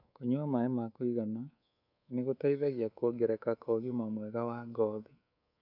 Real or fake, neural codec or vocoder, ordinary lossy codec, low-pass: fake; codec, 24 kHz, 3.1 kbps, DualCodec; none; 5.4 kHz